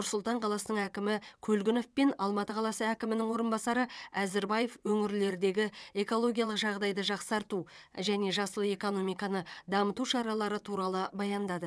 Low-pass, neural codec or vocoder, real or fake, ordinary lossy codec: none; vocoder, 22.05 kHz, 80 mel bands, WaveNeXt; fake; none